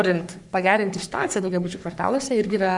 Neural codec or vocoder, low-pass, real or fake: codec, 44.1 kHz, 3.4 kbps, Pupu-Codec; 10.8 kHz; fake